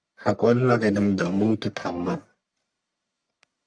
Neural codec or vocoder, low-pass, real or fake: codec, 44.1 kHz, 1.7 kbps, Pupu-Codec; 9.9 kHz; fake